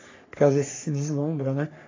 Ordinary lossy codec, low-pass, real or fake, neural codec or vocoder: AAC, 32 kbps; 7.2 kHz; fake; codec, 44.1 kHz, 3.4 kbps, Pupu-Codec